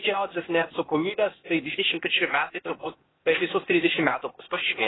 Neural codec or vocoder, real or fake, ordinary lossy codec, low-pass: codec, 16 kHz, 0.8 kbps, ZipCodec; fake; AAC, 16 kbps; 7.2 kHz